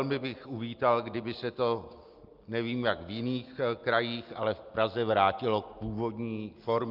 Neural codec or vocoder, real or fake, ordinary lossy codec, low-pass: none; real; Opus, 24 kbps; 5.4 kHz